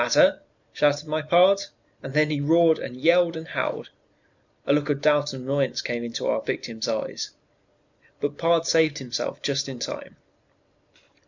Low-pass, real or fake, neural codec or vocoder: 7.2 kHz; real; none